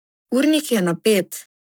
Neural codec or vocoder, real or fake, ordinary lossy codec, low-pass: codec, 44.1 kHz, 7.8 kbps, Pupu-Codec; fake; none; none